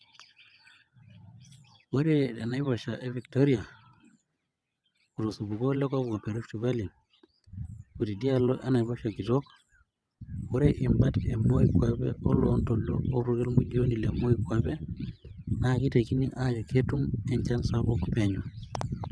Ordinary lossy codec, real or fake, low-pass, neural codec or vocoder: none; fake; none; vocoder, 22.05 kHz, 80 mel bands, WaveNeXt